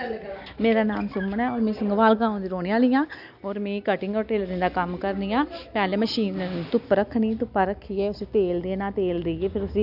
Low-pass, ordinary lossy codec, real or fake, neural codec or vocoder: 5.4 kHz; none; real; none